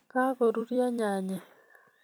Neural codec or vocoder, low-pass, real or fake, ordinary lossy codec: vocoder, 44.1 kHz, 128 mel bands every 256 samples, BigVGAN v2; none; fake; none